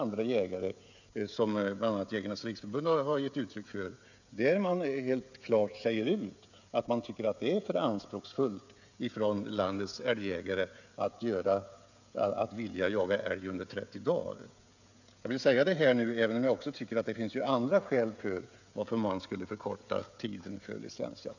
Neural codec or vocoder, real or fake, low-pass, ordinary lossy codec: codec, 16 kHz, 16 kbps, FreqCodec, smaller model; fake; 7.2 kHz; none